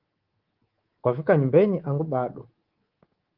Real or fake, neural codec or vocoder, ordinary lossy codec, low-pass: fake; codec, 24 kHz, 3.1 kbps, DualCodec; Opus, 16 kbps; 5.4 kHz